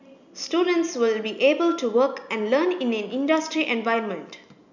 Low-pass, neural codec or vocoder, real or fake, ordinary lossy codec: 7.2 kHz; none; real; none